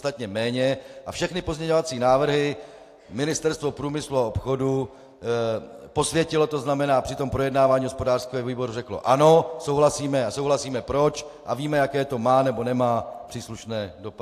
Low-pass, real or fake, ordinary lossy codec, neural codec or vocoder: 14.4 kHz; real; AAC, 64 kbps; none